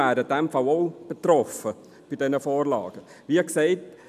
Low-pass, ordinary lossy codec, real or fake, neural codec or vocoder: 14.4 kHz; none; real; none